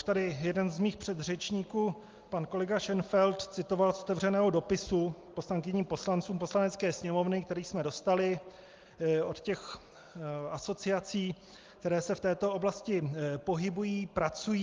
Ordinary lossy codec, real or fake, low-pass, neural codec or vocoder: Opus, 32 kbps; real; 7.2 kHz; none